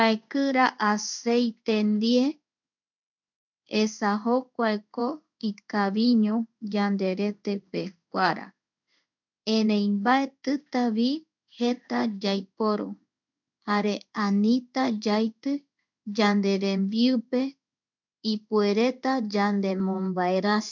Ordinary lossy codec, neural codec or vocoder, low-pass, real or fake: none; codec, 16 kHz in and 24 kHz out, 1 kbps, XY-Tokenizer; 7.2 kHz; fake